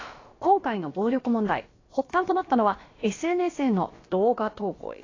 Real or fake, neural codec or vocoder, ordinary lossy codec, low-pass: fake; codec, 16 kHz, about 1 kbps, DyCAST, with the encoder's durations; AAC, 32 kbps; 7.2 kHz